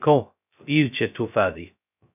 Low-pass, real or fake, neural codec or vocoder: 3.6 kHz; fake; codec, 16 kHz, 0.2 kbps, FocalCodec